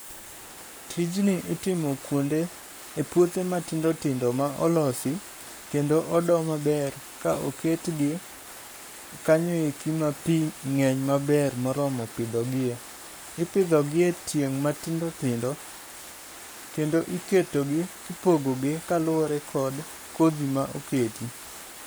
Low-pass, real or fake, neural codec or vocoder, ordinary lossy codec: none; fake; codec, 44.1 kHz, 7.8 kbps, Pupu-Codec; none